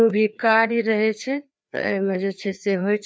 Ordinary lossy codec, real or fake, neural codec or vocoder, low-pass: none; fake; codec, 16 kHz, 2 kbps, FreqCodec, larger model; none